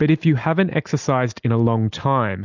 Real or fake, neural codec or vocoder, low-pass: real; none; 7.2 kHz